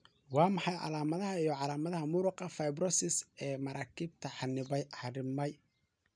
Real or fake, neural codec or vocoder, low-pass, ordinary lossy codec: real; none; 9.9 kHz; none